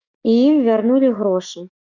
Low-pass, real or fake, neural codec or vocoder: 7.2 kHz; fake; autoencoder, 48 kHz, 128 numbers a frame, DAC-VAE, trained on Japanese speech